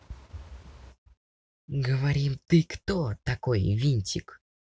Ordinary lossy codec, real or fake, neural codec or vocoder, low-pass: none; real; none; none